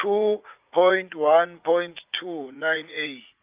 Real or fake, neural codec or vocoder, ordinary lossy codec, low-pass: fake; vocoder, 44.1 kHz, 80 mel bands, Vocos; Opus, 24 kbps; 3.6 kHz